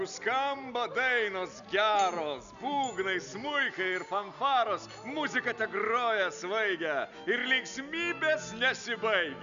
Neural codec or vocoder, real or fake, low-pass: none; real; 7.2 kHz